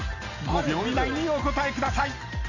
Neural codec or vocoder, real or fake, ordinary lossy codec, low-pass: none; real; none; 7.2 kHz